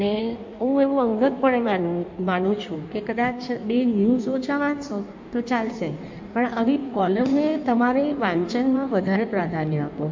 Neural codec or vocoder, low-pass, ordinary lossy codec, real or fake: codec, 16 kHz in and 24 kHz out, 1.1 kbps, FireRedTTS-2 codec; 7.2 kHz; MP3, 48 kbps; fake